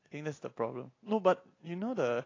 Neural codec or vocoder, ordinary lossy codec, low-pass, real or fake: none; AAC, 32 kbps; 7.2 kHz; real